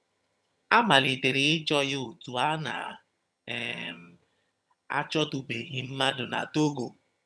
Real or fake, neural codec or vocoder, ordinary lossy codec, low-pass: fake; vocoder, 22.05 kHz, 80 mel bands, HiFi-GAN; none; none